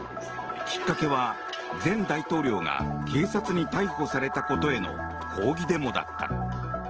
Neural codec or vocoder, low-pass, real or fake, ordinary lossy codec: none; 7.2 kHz; real; Opus, 16 kbps